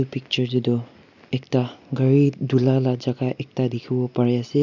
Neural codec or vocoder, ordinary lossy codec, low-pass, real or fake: none; none; 7.2 kHz; real